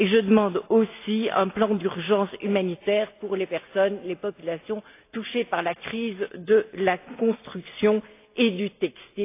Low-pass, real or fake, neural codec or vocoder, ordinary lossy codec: 3.6 kHz; real; none; AAC, 24 kbps